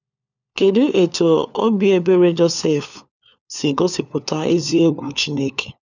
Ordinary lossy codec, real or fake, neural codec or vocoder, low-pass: none; fake; codec, 16 kHz, 4 kbps, FunCodec, trained on LibriTTS, 50 frames a second; 7.2 kHz